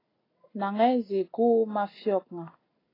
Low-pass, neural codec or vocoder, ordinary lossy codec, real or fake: 5.4 kHz; none; AAC, 24 kbps; real